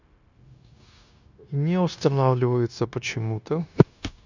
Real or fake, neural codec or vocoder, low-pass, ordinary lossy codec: fake; codec, 16 kHz, 0.9 kbps, LongCat-Audio-Codec; 7.2 kHz; none